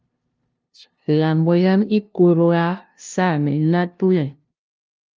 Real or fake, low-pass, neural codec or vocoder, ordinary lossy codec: fake; 7.2 kHz; codec, 16 kHz, 0.5 kbps, FunCodec, trained on LibriTTS, 25 frames a second; Opus, 24 kbps